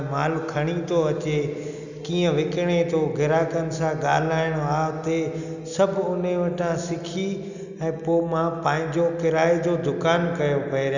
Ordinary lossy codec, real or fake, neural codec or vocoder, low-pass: none; real; none; 7.2 kHz